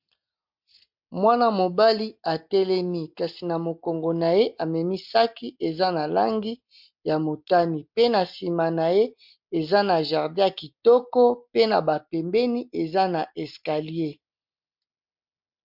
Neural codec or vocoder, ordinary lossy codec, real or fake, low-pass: none; MP3, 48 kbps; real; 5.4 kHz